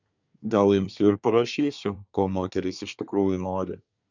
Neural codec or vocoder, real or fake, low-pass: codec, 24 kHz, 1 kbps, SNAC; fake; 7.2 kHz